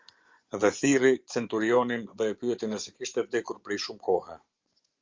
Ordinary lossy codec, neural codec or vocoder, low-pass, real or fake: Opus, 32 kbps; vocoder, 24 kHz, 100 mel bands, Vocos; 7.2 kHz; fake